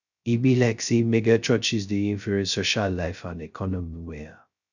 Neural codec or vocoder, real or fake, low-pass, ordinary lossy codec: codec, 16 kHz, 0.2 kbps, FocalCodec; fake; 7.2 kHz; none